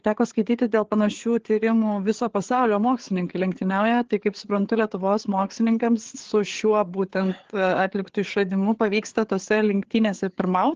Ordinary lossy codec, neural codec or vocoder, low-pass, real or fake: Opus, 16 kbps; codec, 16 kHz, 4 kbps, FreqCodec, larger model; 7.2 kHz; fake